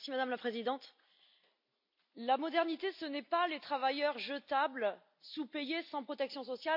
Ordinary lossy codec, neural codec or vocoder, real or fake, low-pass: MP3, 48 kbps; none; real; 5.4 kHz